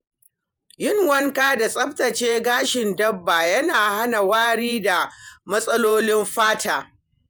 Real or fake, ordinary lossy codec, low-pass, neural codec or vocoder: fake; none; none; vocoder, 48 kHz, 128 mel bands, Vocos